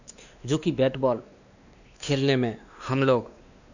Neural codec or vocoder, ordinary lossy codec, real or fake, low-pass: codec, 16 kHz, 2 kbps, X-Codec, WavLM features, trained on Multilingual LibriSpeech; none; fake; 7.2 kHz